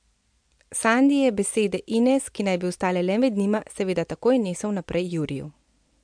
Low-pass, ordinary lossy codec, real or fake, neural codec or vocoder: 9.9 kHz; MP3, 64 kbps; real; none